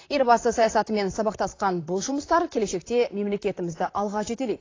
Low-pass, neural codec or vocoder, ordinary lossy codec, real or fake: 7.2 kHz; vocoder, 44.1 kHz, 128 mel bands, Pupu-Vocoder; AAC, 32 kbps; fake